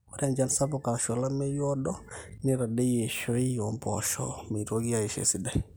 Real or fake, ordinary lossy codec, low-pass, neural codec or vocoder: real; none; none; none